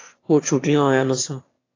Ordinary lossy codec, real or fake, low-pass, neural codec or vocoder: AAC, 32 kbps; fake; 7.2 kHz; autoencoder, 22.05 kHz, a latent of 192 numbers a frame, VITS, trained on one speaker